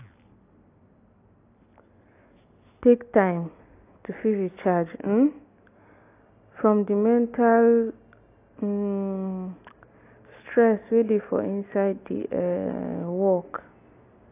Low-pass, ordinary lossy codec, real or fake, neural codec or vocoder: 3.6 kHz; AAC, 24 kbps; real; none